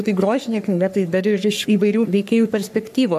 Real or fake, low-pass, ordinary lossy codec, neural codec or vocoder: fake; 14.4 kHz; MP3, 96 kbps; codec, 44.1 kHz, 3.4 kbps, Pupu-Codec